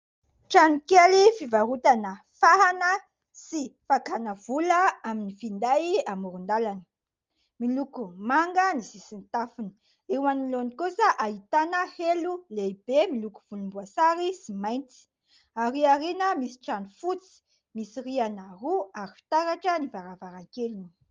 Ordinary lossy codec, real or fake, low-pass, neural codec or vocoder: Opus, 32 kbps; real; 7.2 kHz; none